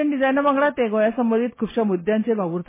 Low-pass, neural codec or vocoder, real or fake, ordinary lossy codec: 3.6 kHz; none; real; MP3, 16 kbps